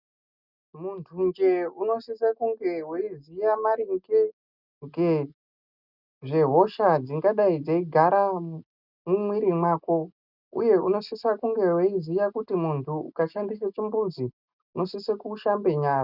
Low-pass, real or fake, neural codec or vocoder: 5.4 kHz; real; none